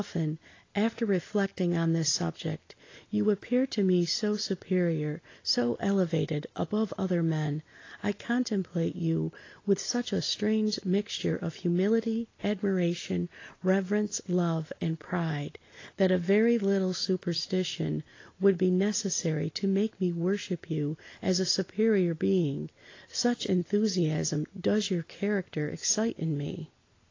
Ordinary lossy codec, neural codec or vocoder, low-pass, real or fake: AAC, 32 kbps; none; 7.2 kHz; real